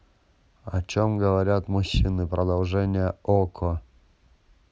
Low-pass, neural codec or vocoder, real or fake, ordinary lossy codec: none; none; real; none